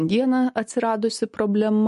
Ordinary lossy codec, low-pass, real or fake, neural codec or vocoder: MP3, 48 kbps; 14.4 kHz; real; none